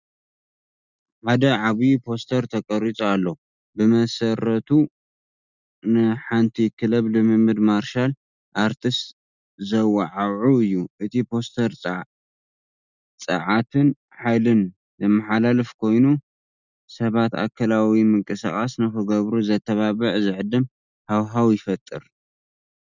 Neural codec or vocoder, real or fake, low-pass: none; real; 7.2 kHz